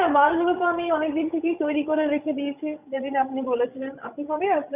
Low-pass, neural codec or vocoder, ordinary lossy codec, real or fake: 3.6 kHz; codec, 16 kHz, 16 kbps, FreqCodec, larger model; none; fake